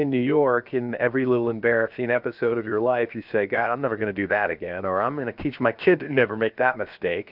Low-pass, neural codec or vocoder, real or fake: 5.4 kHz; codec, 16 kHz, 0.7 kbps, FocalCodec; fake